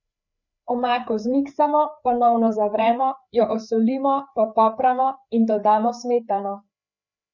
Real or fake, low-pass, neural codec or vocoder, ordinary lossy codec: fake; 7.2 kHz; codec, 16 kHz, 4 kbps, FreqCodec, larger model; none